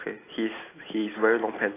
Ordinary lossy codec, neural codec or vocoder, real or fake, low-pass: AAC, 16 kbps; none; real; 3.6 kHz